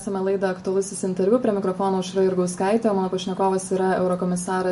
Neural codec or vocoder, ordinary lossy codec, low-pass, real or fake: none; MP3, 48 kbps; 14.4 kHz; real